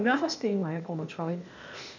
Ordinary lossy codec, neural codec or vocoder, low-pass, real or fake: none; codec, 16 kHz, 0.8 kbps, ZipCodec; 7.2 kHz; fake